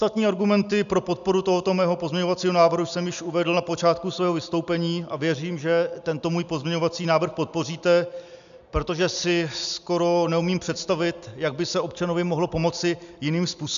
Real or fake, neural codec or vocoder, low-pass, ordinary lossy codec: real; none; 7.2 kHz; AAC, 96 kbps